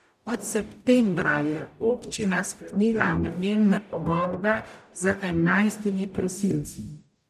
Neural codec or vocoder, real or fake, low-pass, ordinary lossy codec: codec, 44.1 kHz, 0.9 kbps, DAC; fake; 14.4 kHz; none